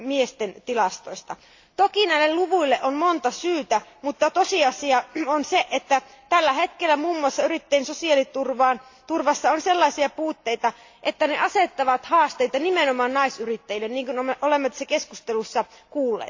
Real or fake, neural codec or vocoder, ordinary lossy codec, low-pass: real; none; AAC, 48 kbps; 7.2 kHz